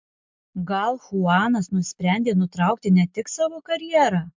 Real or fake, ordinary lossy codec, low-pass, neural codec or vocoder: real; MP3, 64 kbps; 7.2 kHz; none